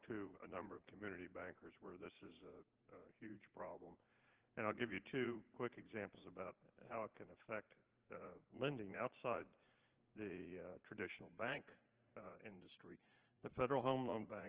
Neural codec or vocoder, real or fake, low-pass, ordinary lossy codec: vocoder, 44.1 kHz, 80 mel bands, Vocos; fake; 3.6 kHz; Opus, 16 kbps